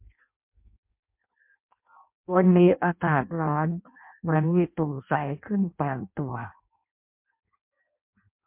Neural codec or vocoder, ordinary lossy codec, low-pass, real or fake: codec, 16 kHz in and 24 kHz out, 0.6 kbps, FireRedTTS-2 codec; MP3, 32 kbps; 3.6 kHz; fake